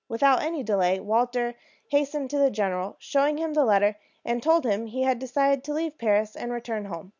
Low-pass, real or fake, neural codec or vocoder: 7.2 kHz; real; none